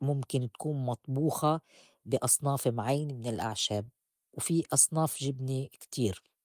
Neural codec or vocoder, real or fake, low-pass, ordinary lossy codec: none; real; 14.4 kHz; Opus, 32 kbps